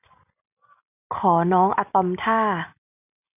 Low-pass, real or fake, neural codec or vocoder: 3.6 kHz; real; none